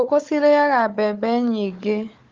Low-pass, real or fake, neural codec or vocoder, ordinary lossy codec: 7.2 kHz; fake; codec, 16 kHz, 4 kbps, FunCodec, trained on Chinese and English, 50 frames a second; Opus, 32 kbps